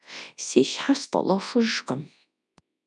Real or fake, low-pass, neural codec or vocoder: fake; 10.8 kHz; codec, 24 kHz, 0.9 kbps, WavTokenizer, large speech release